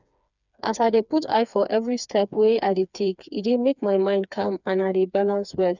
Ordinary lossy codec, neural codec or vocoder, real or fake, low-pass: none; codec, 16 kHz, 4 kbps, FreqCodec, smaller model; fake; 7.2 kHz